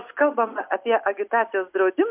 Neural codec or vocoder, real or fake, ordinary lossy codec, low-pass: none; real; MP3, 32 kbps; 3.6 kHz